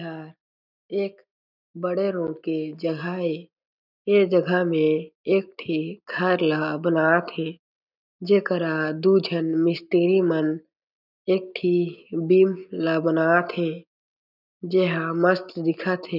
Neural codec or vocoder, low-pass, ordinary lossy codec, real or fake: none; 5.4 kHz; none; real